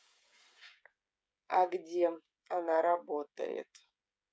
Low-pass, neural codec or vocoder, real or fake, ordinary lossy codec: none; codec, 16 kHz, 16 kbps, FreqCodec, smaller model; fake; none